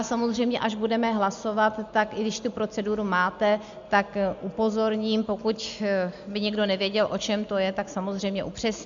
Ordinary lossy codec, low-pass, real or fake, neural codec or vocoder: MP3, 64 kbps; 7.2 kHz; real; none